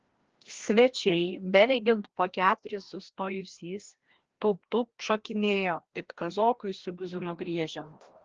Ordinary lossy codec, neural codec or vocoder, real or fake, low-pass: Opus, 16 kbps; codec, 16 kHz, 1 kbps, FreqCodec, larger model; fake; 7.2 kHz